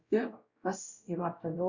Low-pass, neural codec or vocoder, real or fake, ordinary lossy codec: 7.2 kHz; codec, 16 kHz, 0.5 kbps, X-Codec, WavLM features, trained on Multilingual LibriSpeech; fake; Opus, 64 kbps